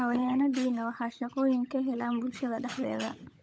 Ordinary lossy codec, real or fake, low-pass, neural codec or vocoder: none; fake; none; codec, 16 kHz, 16 kbps, FunCodec, trained on LibriTTS, 50 frames a second